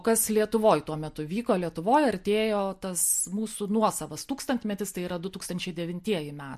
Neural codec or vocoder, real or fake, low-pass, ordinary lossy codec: none; real; 14.4 kHz; MP3, 64 kbps